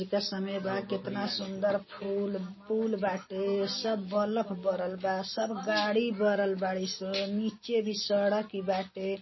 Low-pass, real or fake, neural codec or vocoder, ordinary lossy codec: 7.2 kHz; real; none; MP3, 24 kbps